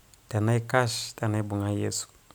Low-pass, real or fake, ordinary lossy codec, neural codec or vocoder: none; real; none; none